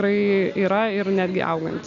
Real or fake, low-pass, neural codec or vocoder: real; 7.2 kHz; none